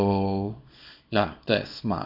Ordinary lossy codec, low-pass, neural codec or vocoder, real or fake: none; 5.4 kHz; codec, 24 kHz, 0.9 kbps, WavTokenizer, small release; fake